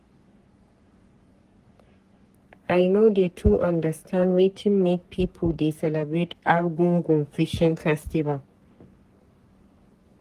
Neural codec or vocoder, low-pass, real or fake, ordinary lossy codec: codec, 44.1 kHz, 3.4 kbps, Pupu-Codec; 14.4 kHz; fake; Opus, 24 kbps